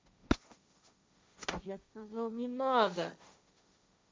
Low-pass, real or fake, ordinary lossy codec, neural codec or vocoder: none; fake; none; codec, 16 kHz, 1.1 kbps, Voila-Tokenizer